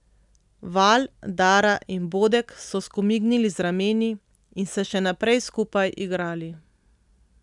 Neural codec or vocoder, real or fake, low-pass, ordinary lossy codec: none; real; 10.8 kHz; none